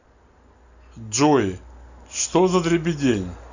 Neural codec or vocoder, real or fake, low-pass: none; real; 7.2 kHz